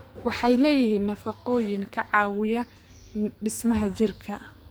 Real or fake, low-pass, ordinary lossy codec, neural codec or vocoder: fake; none; none; codec, 44.1 kHz, 2.6 kbps, SNAC